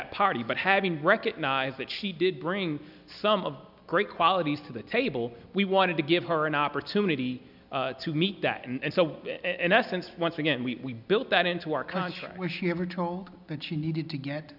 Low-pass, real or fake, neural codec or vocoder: 5.4 kHz; real; none